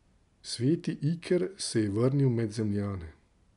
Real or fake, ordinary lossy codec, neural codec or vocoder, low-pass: real; none; none; 10.8 kHz